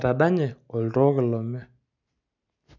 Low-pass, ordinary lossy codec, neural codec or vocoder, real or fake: 7.2 kHz; none; none; real